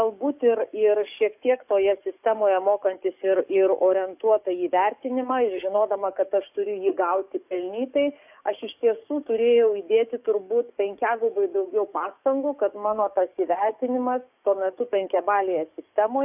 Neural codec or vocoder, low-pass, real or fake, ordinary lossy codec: codec, 44.1 kHz, 7.8 kbps, DAC; 3.6 kHz; fake; AAC, 32 kbps